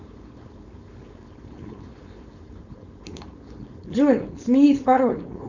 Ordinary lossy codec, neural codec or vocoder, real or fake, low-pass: Opus, 64 kbps; codec, 16 kHz, 4.8 kbps, FACodec; fake; 7.2 kHz